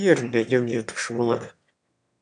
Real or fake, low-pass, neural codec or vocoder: fake; 9.9 kHz; autoencoder, 22.05 kHz, a latent of 192 numbers a frame, VITS, trained on one speaker